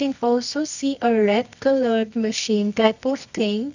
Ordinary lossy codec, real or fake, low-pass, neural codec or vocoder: none; fake; 7.2 kHz; codec, 24 kHz, 0.9 kbps, WavTokenizer, medium music audio release